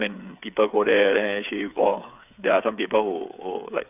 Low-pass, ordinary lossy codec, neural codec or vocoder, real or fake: 3.6 kHz; none; codec, 16 kHz, 8 kbps, FreqCodec, smaller model; fake